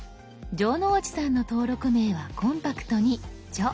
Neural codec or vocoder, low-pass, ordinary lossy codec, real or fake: none; none; none; real